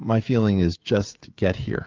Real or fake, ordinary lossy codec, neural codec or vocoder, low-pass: fake; Opus, 32 kbps; codec, 16 kHz, 16 kbps, FreqCodec, smaller model; 7.2 kHz